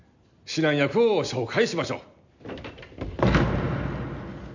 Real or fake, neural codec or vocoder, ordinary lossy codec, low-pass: real; none; none; 7.2 kHz